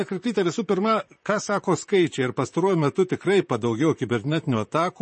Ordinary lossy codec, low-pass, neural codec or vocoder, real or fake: MP3, 32 kbps; 10.8 kHz; vocoder, 44.1 kHz, 128 mel bands, Pupu-Vocoder; fake